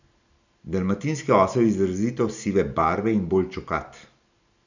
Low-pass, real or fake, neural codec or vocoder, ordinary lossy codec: 7.2 kHz; real; none; none